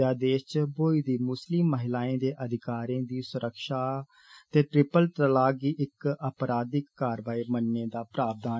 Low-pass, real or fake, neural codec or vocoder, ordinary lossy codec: none; real; none; none